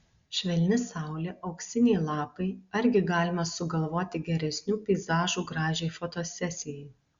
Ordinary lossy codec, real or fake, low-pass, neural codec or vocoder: Opus, 64 kbps; real; 7.2 kHz; none